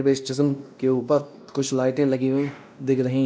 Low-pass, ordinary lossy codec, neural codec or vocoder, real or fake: none; none; codec, 16 kHz, 1 kbps, X-Codec, WavLM features, trained on Multilingual LibriSpeech; fake